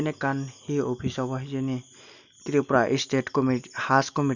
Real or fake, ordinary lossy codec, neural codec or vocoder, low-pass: real; none; none; 7.2 kHz